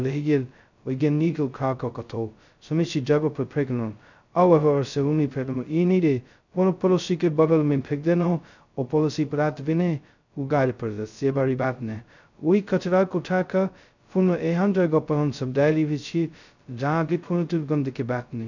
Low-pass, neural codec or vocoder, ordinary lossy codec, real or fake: 7.2 kHz; codec, 16 kHz, 0.2 kbps, FocalCodec; none; fake